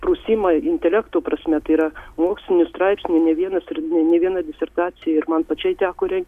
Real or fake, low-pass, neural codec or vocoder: real; 14.4 kHz; none